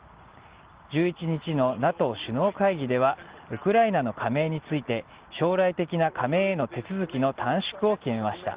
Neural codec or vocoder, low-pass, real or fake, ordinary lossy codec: none; 3.6 kHz; real; Opus, 16 kbps